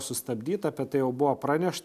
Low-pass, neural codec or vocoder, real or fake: 14.4 kHz; none; real